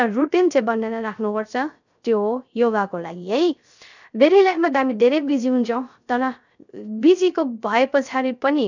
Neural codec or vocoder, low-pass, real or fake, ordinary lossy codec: codec, 16 kHz, 0.3 kbps, FocalCodec; 7.2 kHz; fake; none